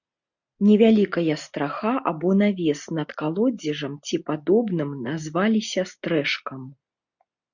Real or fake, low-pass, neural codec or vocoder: real; 7.2 kHz; none